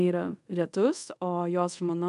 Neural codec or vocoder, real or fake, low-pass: codec, 24 kHz, 0.5 kbps, DualCodec; fake; 10.8 kHz